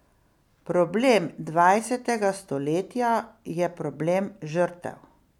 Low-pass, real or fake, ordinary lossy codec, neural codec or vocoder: 19.8 kHz; real; none; none